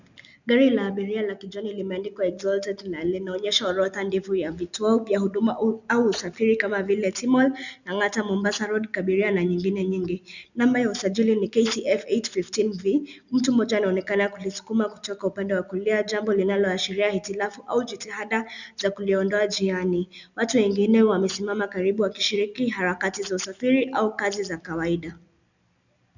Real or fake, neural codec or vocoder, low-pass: real; none; 7.2 kHz